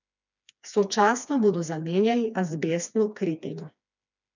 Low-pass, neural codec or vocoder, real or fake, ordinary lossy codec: 7.2 kHz; codec, 16 kHz, 2 kbps, FreqCodec, smaller model; fake; none